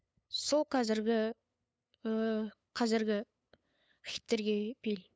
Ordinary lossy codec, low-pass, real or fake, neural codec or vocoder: none; none; fake; codec, 16 kHz, 8 kbps, FunCodec, trained on LibriTTS, 25 frames a second